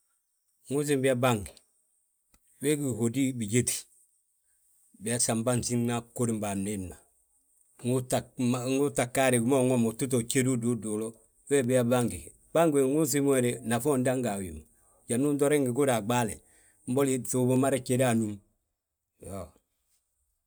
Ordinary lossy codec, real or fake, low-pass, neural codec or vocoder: none; real; none; none